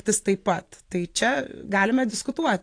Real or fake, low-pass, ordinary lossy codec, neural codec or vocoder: fake; 9.9 kHz; AAC, 48 kbps; vocoder, 44.1 kHz, 128 mel bands every 512 samples, BigVGAN v2